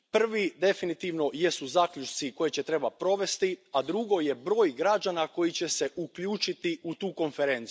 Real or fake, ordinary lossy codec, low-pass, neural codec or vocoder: real; none; none; none